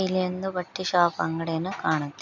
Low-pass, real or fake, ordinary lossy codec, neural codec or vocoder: 7.2 kHz; real; none; none